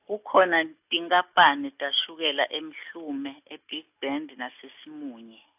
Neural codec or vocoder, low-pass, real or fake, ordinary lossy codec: none; 3.6 kHz; real; AAC, 32 kbps